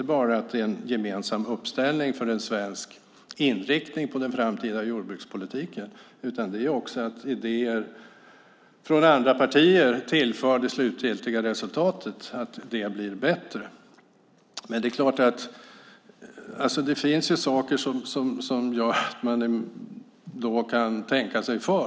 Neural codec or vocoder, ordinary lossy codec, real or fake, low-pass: none; none; real; none